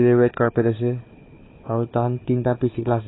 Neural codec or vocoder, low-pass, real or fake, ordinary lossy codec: codec, 16 kHz, 4 kbps, FunCodec, trained on Chinese and English, 50 frames a second; 7.2 kHz; fake; AAC, 16 kbps